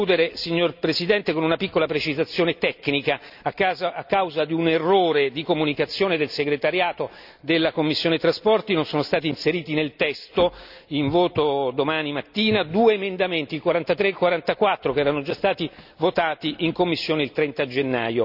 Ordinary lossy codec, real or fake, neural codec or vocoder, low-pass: none; real; none; 5.4 kHz